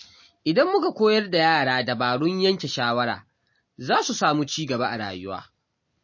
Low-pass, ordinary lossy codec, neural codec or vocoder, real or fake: 7.2 kHz; MP3, 32 kbps; none; real